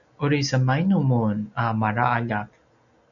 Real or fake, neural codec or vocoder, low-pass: real; none; 7.2 kHz